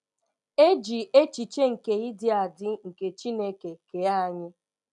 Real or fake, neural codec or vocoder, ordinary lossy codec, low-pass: real; none; none; 10.8 kHz